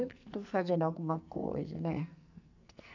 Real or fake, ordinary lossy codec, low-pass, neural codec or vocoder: fake; none; 7.2 kHz; codec, 44.1 kHz, 2.6 kbps, SNAC